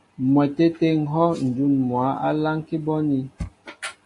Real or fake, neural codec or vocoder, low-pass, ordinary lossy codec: real; none; 10.8 kHz; AAC, 48 kbps